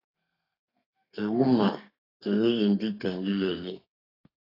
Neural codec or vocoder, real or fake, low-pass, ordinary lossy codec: codec, 32 kHz, 1.9 kbps, SNAC; fake; 5.4 kHz; AAC, 24 kbps